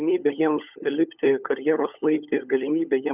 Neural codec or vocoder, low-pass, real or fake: codec, 16 kHz, 16 kbps, FunCodec, trained on LibriTTS, 50 frames a second; 3.6 kHz; fake